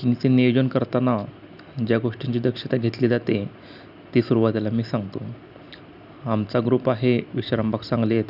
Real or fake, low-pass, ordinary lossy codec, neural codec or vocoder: real; 5.4 kHz; none; none